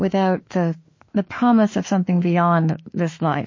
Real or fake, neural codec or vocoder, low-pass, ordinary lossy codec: fake; autoencoder, 48 kHz, 32 numbers a frame, DAC-VAE, trained on Japanese speech; 7.2 kHz; MP3, 32 kbps